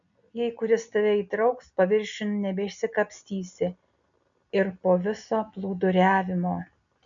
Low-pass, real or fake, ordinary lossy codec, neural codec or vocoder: 7.2 kHz; real; MP3, 96 kbps; none